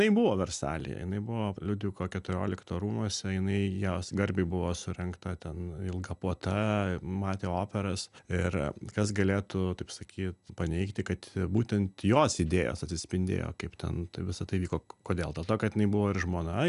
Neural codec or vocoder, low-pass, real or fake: none; 10.8 kHz; real